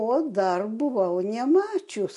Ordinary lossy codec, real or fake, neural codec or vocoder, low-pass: MP3, 48 kbps; real; none; 14.4 kHz